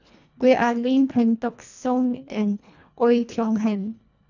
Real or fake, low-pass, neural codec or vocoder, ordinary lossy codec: fake; 7.2 kHz; codec, 24 kHz, 1.5 kbps, HILCodec; none